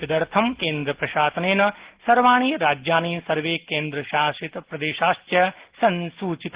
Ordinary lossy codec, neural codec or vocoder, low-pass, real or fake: Opus, 16 kbps; none; 3.6 kHz; real